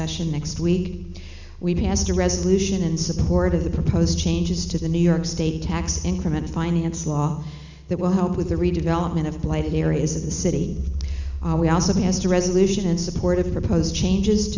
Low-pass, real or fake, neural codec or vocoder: 7.2 kHz; real; none